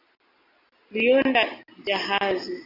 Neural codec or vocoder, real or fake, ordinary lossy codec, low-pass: none; real; AAC, 48 kbps; 5.4 kHz